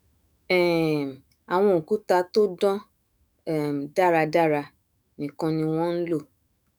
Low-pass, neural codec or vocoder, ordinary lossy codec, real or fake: none; autoencoder, 48 kHz, 128 numbers a frame, DAC-VAE, trained on Japanese speech; none; fake